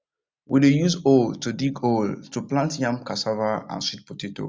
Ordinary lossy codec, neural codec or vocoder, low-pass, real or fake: none; none; none; real